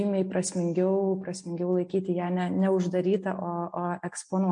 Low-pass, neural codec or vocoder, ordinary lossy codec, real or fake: 9.9 kHz; none; MP3, 48 kbps; real